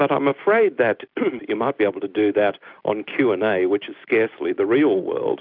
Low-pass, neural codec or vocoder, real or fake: 5.4 kHz; none; real